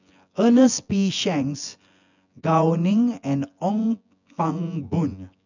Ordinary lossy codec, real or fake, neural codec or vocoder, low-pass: none; fake; vocoder, 24 kHz, 100 mel bands, Vocos; 7.2 kHz